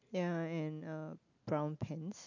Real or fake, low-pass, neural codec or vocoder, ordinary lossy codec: real; 7.2 kHz; none; none